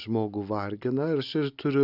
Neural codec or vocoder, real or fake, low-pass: none; real; 5.4 kHz